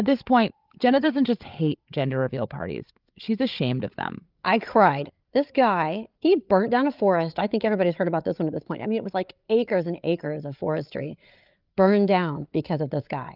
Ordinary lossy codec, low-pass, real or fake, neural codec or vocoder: Opus, 24 kbps; 5.4 kHz; fake; codec, 16 kHz, 8 kbps, FreqCodec, larger model